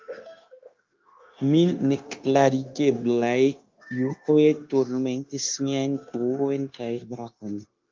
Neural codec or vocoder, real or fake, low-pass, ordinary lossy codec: codec, 16 kHz, 0.9 kbps, LongCat-Audio-Codec; fake; 7.2 kHz; Opus, 24 kbps